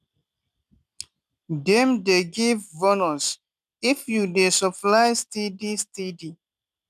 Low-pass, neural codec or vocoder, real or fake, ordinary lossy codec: 14.4 kHz; none; real; none